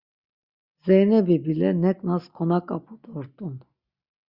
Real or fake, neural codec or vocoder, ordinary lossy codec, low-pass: real; none; Opus, 64 kbps; 5.4 kHz